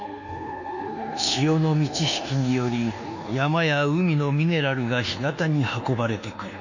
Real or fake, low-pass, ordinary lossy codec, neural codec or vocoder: fake; 7.2 kHz; none; codec, 24 kHz, 1.2 kbps, DualCodec